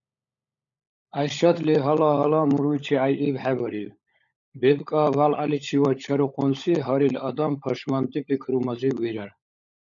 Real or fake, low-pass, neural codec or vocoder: fake; 7.2 kHz; codec, 16 kHz, 16 kbps, FunCodec, trained on LibriTTS, 50 frames a second